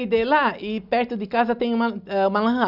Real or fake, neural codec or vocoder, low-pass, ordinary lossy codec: real; none; 5.4 kHz; none